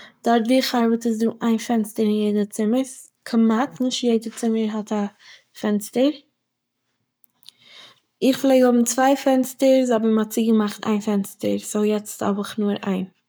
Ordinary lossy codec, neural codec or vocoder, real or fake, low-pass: none; codec, 44.1 kHz, 7.8 kbps, Pupu-Codec; fake; none